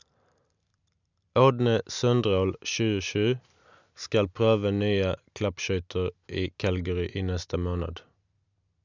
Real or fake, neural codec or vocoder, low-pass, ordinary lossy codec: real; none; 7.2 kHz; none